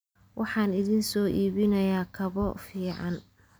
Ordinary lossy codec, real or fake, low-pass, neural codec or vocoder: none; real; none; none